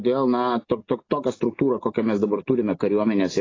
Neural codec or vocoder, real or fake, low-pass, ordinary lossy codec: none; real; 7.2 kHz; AAC, 32 kbps